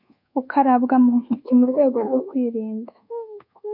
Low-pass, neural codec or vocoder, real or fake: 5.4 kHz; codec, 24 kHz, 1.2 kbps, DualCodec; fake